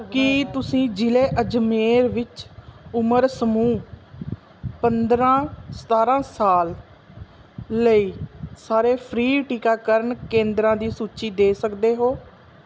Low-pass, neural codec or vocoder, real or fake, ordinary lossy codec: none; none; real; none